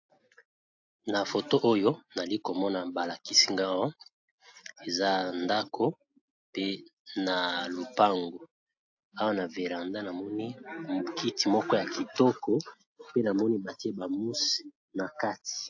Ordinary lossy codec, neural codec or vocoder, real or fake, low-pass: AAC, 48 kbps; none; real; 7.2 kHz